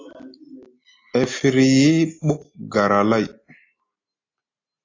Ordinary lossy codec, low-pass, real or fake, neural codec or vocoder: AAC, 32 kbps; 7.2 kHz; real; none